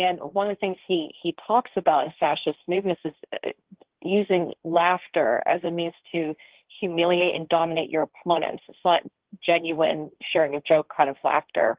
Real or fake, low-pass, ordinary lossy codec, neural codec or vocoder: fake; 3.6 kHz; Opus, 16 kbps; codec, 16 kHz, 1.1 kbps, Voila-Tokenizer